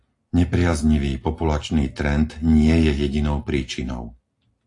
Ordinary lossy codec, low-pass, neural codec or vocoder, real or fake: AAC, 48 kbps; 10.8 kHz; none; real